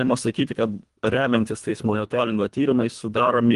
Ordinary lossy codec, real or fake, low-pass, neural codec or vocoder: Opus, 24 kbps; fake; 10.8 kHz; codec, 24 kHz, 1.5 kbps, HILCodec